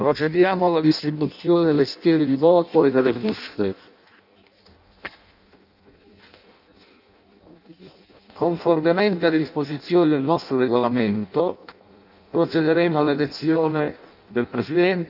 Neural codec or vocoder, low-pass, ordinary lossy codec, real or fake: codec, 16 kHz in and 24 kHz out, 0.6 kbps, FireRedTTS-2 codec; 5.4 kHz; none; fake